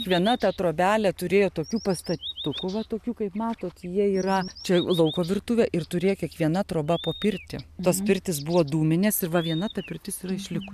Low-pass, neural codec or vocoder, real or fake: 14.4 kHz; none; real